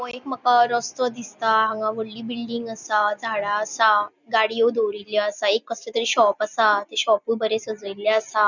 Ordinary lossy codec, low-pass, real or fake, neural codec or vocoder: none; 7.2 kHz; real; none